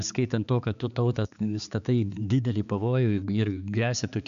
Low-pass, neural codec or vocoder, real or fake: 7.2 kHz; codec, 16 kHz, 4 kbps, X-Codec, HuBERT features, trained on balanced general audio; fake